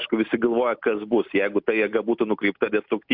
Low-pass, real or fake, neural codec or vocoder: 5.4 kHz; real; none